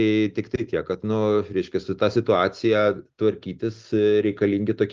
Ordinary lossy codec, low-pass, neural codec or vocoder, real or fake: Opus, 32 kbps; 7.2 kHz; none; real